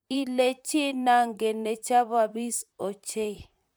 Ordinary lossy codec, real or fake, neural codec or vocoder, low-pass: none; fake; vocoder, 44.1 kHz, 128 mel bands, Pupu-Vocoder; none